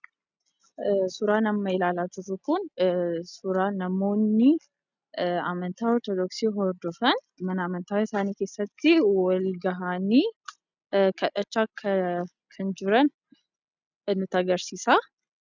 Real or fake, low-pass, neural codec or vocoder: real; 7.2 kHz; none